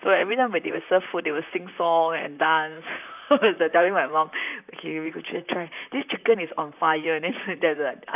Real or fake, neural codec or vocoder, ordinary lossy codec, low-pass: fake; vocoder, 44.1 kHz, 128 mel bands, Pupu-Vocoder; none; 3.6 kHz